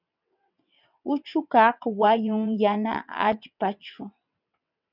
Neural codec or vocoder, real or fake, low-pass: vocoder, 22.05 kHz, 80 mel bands, WaveNeXt; fake; 5.4 kHz